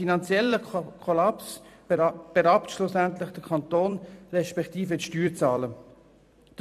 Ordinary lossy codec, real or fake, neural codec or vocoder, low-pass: none; fake; vocoder, 44.1 kHz, 128 mel bands every 256 samples, BigVGAN v2; 14.4 kHz